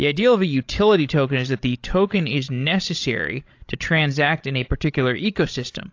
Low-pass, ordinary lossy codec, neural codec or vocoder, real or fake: 7.2 kHz; AAC, 48 kbps; codec, 16 kHz, 16 kbps, FreqCodec, larger model; fake